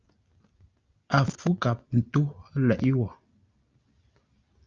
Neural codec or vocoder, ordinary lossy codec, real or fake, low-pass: none; Opus, 24 kbps; real; 7.2 kHz